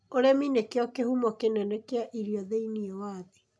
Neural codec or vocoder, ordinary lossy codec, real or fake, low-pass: none; none; real; none